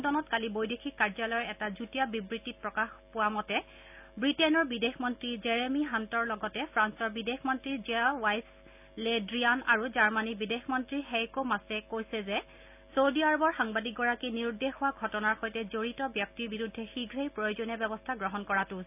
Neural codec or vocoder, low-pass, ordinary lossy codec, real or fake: none; 3.6 kHz; none; real